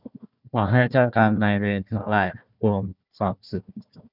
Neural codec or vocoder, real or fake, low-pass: codec, 16 kHz, 1 kbps, FunCodec, trained on Chinese and English, 50 frames a second; fake; 5.4 kHz